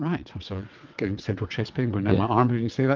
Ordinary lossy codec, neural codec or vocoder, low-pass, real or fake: Opus, 24 kbps; vocoder, 22.05 kHz, 80 mel bands, WaveNeXt; 7.2 kHz; fake